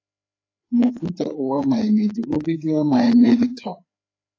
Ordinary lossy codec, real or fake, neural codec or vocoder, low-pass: AAC, 32 kbps; fake; codec, 16 kHz, 4 kbps, FreqCodec, larger model; 7.2 kHz